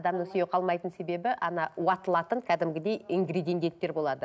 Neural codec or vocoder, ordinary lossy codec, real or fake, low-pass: none; none; real; none